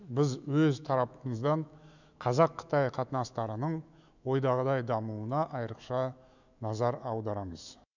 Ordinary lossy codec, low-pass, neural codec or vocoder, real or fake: none; 7.2 kHz; autoencoder, 48 kHz, 128 numbers a frame, DAC-VAE, trained on Japanese speech; fake